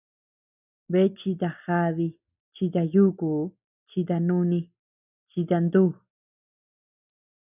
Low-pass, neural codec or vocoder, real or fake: 3.6 kHz; none; real